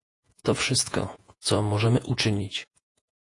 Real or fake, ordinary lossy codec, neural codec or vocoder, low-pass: fake; AAC, 48 kbps; vocoder, 48 kHz, 128 mel bands, Vocos; 10.8 kHz